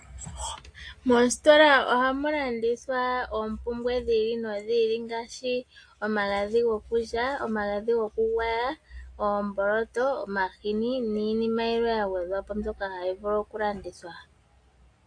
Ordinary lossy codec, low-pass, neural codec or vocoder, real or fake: AAC, 48 kbps; 9.9 kHz; none; real